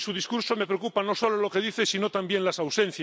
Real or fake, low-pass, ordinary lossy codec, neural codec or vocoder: real; none; none; none